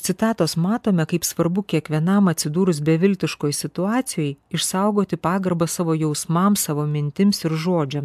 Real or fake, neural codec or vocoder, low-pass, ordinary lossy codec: real; none; 14.4 kHz; AAC, 96 kbps